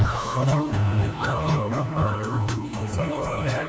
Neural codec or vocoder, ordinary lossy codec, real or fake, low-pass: codec, 16 kHz, 1 kbps, FunCodec, trained on LibriTTS, 50 frames a second; none; fake; none